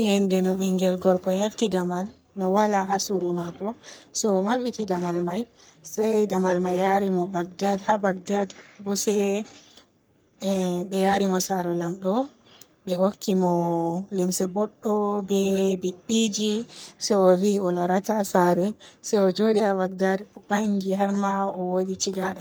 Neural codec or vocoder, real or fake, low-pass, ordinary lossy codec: codec, 44.1 kHz, 3.4 kbps, Pupu-Codec; fake; none; none